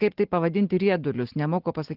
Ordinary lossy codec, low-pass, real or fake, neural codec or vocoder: Opus, 16 kbps; 5.4 kHz; real; none